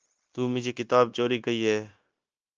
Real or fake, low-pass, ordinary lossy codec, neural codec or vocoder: fake; 7.2 kHz; Opus, 24 kbps; codec, 16 kHz, 0.9 kbps, LongCat-Audio-Codec